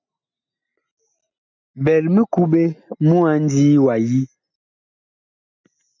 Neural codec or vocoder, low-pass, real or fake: none; 7.2 kHz; real